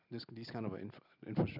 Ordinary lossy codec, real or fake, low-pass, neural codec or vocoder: none; real; 5.4 kHz; none